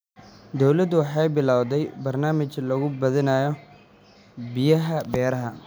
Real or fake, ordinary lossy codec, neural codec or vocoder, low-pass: real; none; none; none